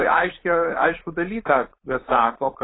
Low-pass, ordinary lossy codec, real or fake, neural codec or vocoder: 7.2 kHz; AAC, 16 kbps; fake; codec, 16 kHz, 4.8 kbps, FACodec